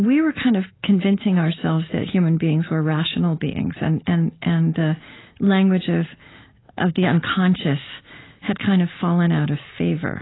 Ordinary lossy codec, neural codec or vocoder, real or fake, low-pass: AAC, 16 kbps; none; real; 7.2 kHz